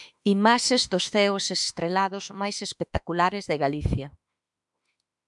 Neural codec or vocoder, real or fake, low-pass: autoencoder, 48 kHz, 32 numbers a frame, DAC-VAE, trained on Japanese speech; fake; 10.8 kHz